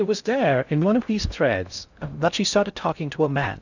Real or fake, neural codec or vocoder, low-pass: fake; codec, 16 kHz in and 24 kHz out, 0.6 kbps, FocalCodec, streaming, 2048 codes; 7.2 kHz